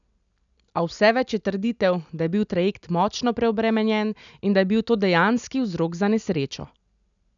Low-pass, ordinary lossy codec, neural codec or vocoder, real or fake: 7.2 kHz; none; none; real